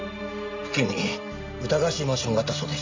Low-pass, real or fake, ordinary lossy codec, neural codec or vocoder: 7.2 kHz; real; MP3, 64 kbps; none